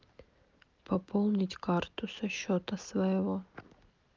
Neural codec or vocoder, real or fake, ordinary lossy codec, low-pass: none; real; Opus, 32 kbps; 7.2 kHz